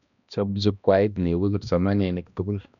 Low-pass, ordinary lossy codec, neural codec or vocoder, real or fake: 7.2 kHz; none; codec, 16 kHz, 1 kbps, X-Codec, HuBERT features, trained on balanced general audio; fake